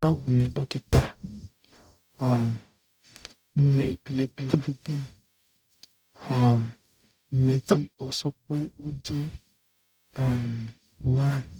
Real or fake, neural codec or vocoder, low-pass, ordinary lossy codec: fake; codec, 44.1 kHz, 0.9 kbps, DAC; 19.8 kHz; none